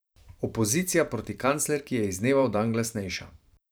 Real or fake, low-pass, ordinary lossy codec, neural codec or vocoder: fake; none; none; vocoder, 44.1 kHz, 128 mel bands every 512 samples, BigVGAN v2